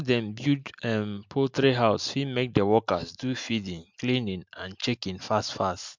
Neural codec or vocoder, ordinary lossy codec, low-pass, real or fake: none; MP3, 64 kbps; 7.2 kHz; real